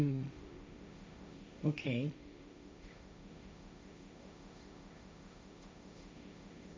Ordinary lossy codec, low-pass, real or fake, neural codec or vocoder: none; none; fake; codec, 16 kHz, 1.1 kbps, Voila-Tokenizer